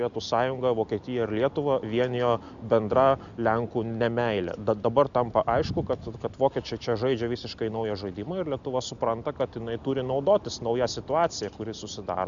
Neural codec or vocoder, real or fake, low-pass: none; real; 7.2 kHz